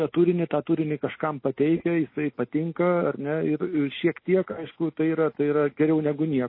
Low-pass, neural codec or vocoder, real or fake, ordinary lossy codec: 5.4 kHz; none; real; MP3, 24 kbps